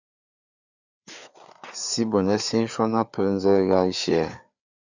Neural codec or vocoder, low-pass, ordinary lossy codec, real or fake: codec, 16 kHz, 4 kbps, FreqCodec, larger model; 7.2 kHz; Opus, 64 kbps; fake